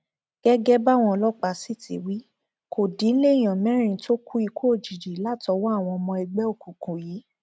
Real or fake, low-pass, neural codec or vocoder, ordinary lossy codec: real; none; none; none